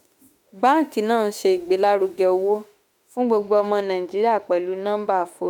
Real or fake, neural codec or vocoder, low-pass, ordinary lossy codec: fake; autoencoder, 48 kHz, 32 numbers a frame, DAC-VAE, trained on Japanese speech; 19.8 kHz; MP3, 96 kbps